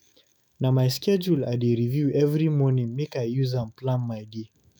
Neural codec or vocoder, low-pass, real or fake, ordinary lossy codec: autoencoder, 48 kHz, 128 numbers a frame, DAC-VAE, trained on Japanese speech; none; fake; none